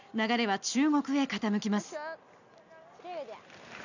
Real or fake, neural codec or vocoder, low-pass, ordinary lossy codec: real; none; 7.2 kHz; none